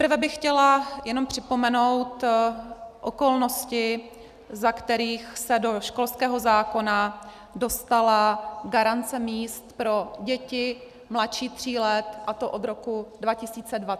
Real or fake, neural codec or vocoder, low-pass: real; none; 14.4 kHz